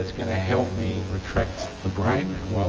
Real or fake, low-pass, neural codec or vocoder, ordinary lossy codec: fake; 7.2 kHz; vocoder, 24 kHz, 100 mel bands, Vocos; Opus, 24 kbps